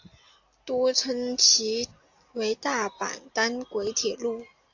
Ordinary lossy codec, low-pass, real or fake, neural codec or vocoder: AAC, 48 kbps; 7.2 kHz; real; none